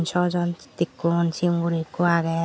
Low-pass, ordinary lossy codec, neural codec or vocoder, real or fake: none; none; none; real